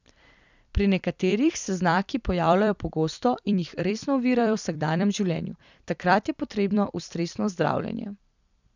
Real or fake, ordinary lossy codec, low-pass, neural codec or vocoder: fake; none; 7.2 kHz; vocoder, 44.1 kHz, 128 mel bands every 256 samples, BigVGAN v2